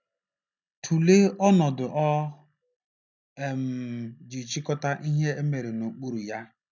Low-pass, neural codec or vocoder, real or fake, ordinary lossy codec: 7.2 kHz; none; real; none